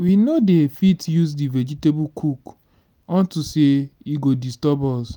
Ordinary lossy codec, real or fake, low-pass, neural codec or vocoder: none; real; none; none